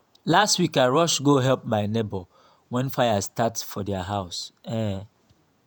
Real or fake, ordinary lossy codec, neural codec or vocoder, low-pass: fake; none; vocoder, 48 kHz, 128 mel bands, Vocos; none